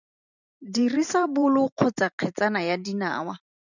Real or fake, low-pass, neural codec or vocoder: fake; 7.2 kHz; vocoder, 44.1 kHz, 128 mel bands every 512 samples, BigVGAN v2